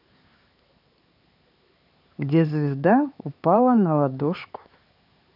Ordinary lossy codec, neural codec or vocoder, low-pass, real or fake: none; codec, 16 kHz, 4 kbps, X-Codec, HuBERT features, trained on LibriSpeech; 5.4 kHz; fake